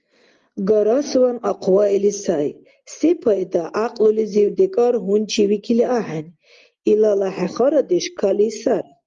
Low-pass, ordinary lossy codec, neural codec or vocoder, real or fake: 7.2 kHz; Opus, 32 kbps; none; real